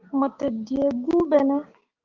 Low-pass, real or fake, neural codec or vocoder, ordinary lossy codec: 7.2 kHz; fake; codec, 16 kHz, 6 kbps, DAC; Opus, 32 kbps